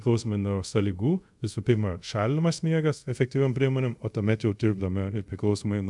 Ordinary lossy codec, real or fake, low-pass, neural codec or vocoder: MP3, 96 kbps; fake; 10.8 kHz; codec, 24 kHz, 0.5 kbps, DualCodec